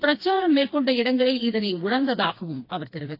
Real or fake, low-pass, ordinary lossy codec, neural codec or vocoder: fake; 5.4 kHz; AAC, 32 kbps; codec, 16 kHz, 2 kbps, FreqCodec, smaller model